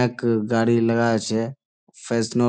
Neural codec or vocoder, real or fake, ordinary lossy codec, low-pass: none; real; none; none